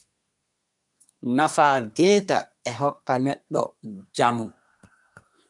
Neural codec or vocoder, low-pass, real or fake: codec, 24 kHz, 1 kbps, SNAC; 10.8 kHz; fake